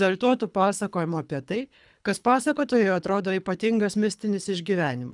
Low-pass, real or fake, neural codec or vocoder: 10.8 kHz; fake; codec, 24 kHz, 3 kbps, HILCodec